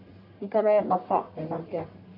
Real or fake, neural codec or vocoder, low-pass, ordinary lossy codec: fake; codec, 44.1 kHz, 1.7 kbps, Pupu-Codec; 5.4 kHz; AAC, 32 kbps